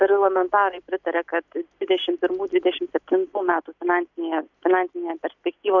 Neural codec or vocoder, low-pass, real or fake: none; 7.2 kHz; real